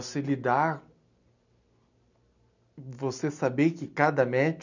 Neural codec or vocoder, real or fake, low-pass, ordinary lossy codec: none; real; 7.2 kHz; none